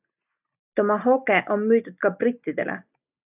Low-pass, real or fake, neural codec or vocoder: 3.6 kHz; real; none